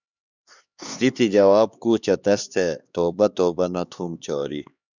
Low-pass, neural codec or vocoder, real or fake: 7.2 kHz; codec, 16 kHz, 2 kbps, X-Codec, HuBERT features, trained on LibriSpeech; fake